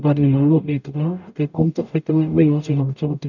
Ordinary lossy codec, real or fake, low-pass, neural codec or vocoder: none; fake; 7.2 kHz; codec, 44.1 kHz, 0.9 kbps, DAC